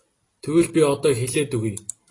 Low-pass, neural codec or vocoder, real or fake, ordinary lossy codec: 10.8 kHz; none; real; AAC, 32 kbps